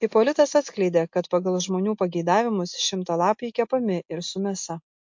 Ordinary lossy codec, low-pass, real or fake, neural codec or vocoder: MP3, 48 kbps; 7.2 kHz; real; none